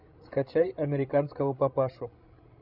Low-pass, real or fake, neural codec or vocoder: 5.4 kHz; fake; codec, 16 kHz, 16 kbps, FreqCodec, larger model